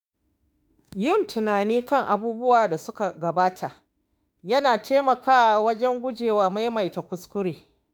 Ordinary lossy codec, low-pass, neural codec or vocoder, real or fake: none; none; autoencoder, 48 kHz, 32 numbers a frame, DAC-VAE, trained on Japanese speech; fake